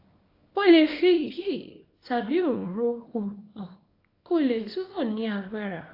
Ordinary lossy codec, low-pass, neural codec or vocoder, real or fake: AAC, 32 kbps; 5.4 kHz; codec, 24 kHz, 0.9 kbps, WavTokenizer, small release; fake